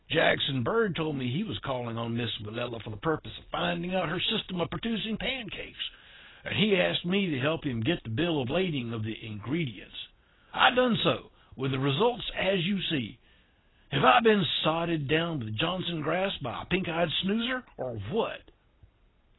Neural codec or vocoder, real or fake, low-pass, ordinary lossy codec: none; real; 7.2 kHz; AAC, 16 kbps